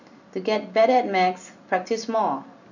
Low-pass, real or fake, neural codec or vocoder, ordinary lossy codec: 7.2 kHz; real; none; none